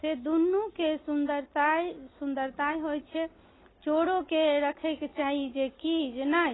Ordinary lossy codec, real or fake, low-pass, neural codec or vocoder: AAC, 16 kbps; real; 7.2 kHz; none